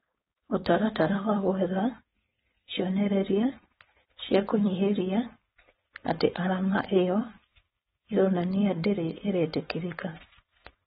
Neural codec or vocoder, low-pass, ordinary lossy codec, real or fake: codec, 16 kHz, 4.8 kbps, FACodec; 7.2 kHz; AAC, 16 kbps; fake